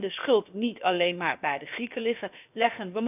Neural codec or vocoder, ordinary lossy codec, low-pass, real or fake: codec, 16 kHz, about 1 kbps, DyCAST, with the encoder's durations; none; 3.6 kHz; fake